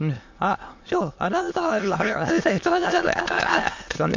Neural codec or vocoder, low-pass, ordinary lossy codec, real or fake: autoencoder, 22.05 kHz, a latent of 192 numbers a frame, VITS, trained on many speakers; 7.2 kHz; MP3, 48 kbps; fake